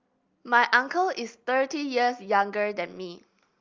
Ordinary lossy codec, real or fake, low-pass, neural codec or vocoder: Opus, 32 kbps; real; 7.2 kHz; none